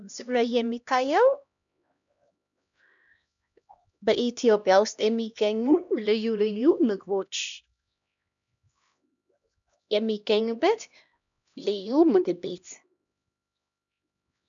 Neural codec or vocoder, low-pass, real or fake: codec, 16 kHz, 1 kbps, X-Codec, HuBERT features, trained on LibriSpeech; 7.2 kHz; fake